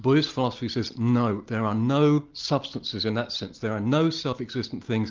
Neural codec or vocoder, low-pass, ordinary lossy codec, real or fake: none; 7.2 kHz; Opus, 32 kbps; real